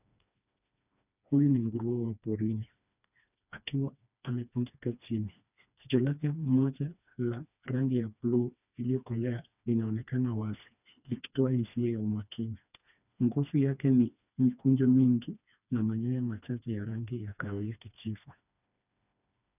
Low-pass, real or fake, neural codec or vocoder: 3.6 kHz; fake; codec, 16 kHz, 2 kbps, FreqCodec, smaller model